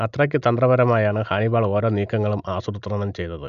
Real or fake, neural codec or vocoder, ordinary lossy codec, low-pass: real; none; none; 7.2 kHz